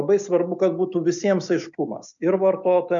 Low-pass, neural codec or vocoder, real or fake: 7.2 kHz; none; real